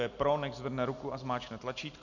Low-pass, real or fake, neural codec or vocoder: 7.2 kHz; real; none